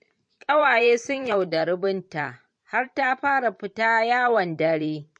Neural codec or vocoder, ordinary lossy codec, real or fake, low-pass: vocoder, 44.1 kHz, 128 mel bands every 512 samples, BigVGAN v2; MP3, 48 kbps; fake; 9.9 kHz